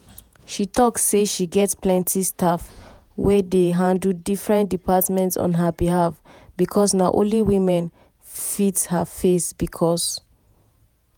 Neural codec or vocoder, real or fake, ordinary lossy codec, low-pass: vocoder, 48 kHz, 128 mel bands, Vocos; fake; none; none